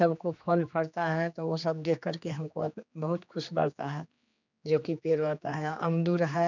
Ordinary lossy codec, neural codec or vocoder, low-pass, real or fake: none; codec, 16 kHz, 2 kbps, X-Codec, HuBERT features, trained on general audio; 7.2 kHz; fake